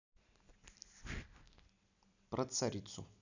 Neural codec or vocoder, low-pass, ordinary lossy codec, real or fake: none; 7.2 kHz; none; real